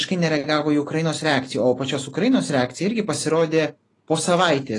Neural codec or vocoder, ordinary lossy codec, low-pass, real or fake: none; AAC, 32 kbps; 10.8 kHz; real